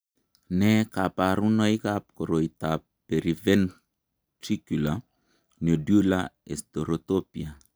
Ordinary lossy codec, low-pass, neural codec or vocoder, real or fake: none; none; none; real